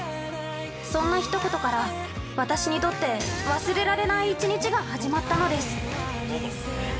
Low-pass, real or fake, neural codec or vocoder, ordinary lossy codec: none; real; none; none